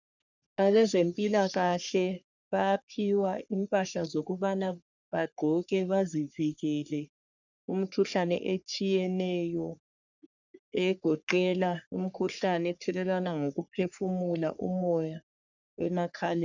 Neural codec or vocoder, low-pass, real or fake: codec, 44.1 kHz, 3.4 kbps, Pupu-Codec; 7.2 kHz; fake